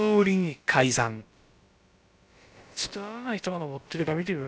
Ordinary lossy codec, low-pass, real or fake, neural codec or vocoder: none; none; fake; codec, 16 kHz, about 1 kbps, DyCAST, with the encoder's durations